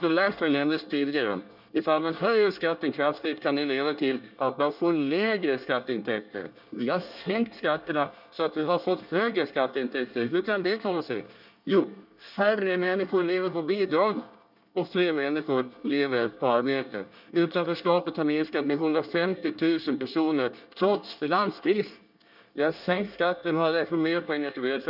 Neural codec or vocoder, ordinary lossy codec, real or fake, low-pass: codec, 24 kHz, 1 kbps, SNAC; none; fake; 5.4 kHz